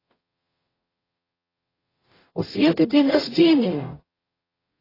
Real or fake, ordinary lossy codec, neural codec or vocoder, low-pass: fake; AAC, 24 kbps; codec, 44.1 kHz, 0.9 kbps, DAC; 5.4 kHz